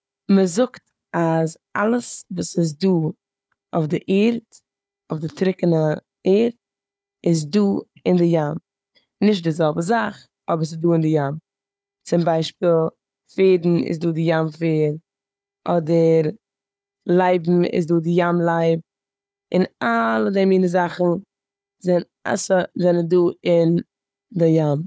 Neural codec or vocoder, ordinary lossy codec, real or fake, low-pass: codec, 16 kHz, 4 kbps, FunCodec, trained on Chinese and English, 50 frames a second; none; fake; none